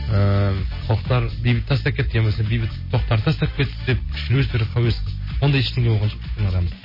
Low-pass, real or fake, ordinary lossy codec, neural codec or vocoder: 5.4 kHz; real; MP3, 24 kbps; none